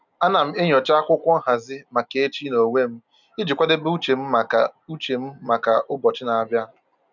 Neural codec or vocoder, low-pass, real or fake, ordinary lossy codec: none; 7.2 kHz; real; none